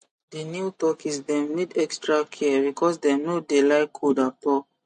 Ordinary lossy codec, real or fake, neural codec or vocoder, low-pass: MP3, 48 kbps; real; none; 14.4 kHz